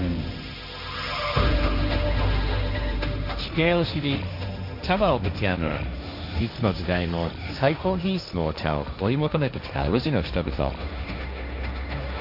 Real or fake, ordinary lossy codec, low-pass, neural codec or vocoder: fake; AAC, 48 kbps; 5.4 kHz; codec, 16 kHz, 1.1 kbps, Voila-Tokenizer